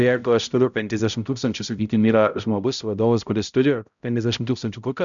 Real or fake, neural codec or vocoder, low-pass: fake; codec, 16 kHz, 0.5 kbps, X-Codec, HuBERT features, trained on balanced general audio; 7.2 kHz